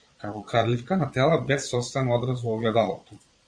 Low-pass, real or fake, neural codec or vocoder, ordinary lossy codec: 9.9 kHz; fake; vocoder, 22.05 kHz, 80 mel bands, Vocos; Opus, 64 kbps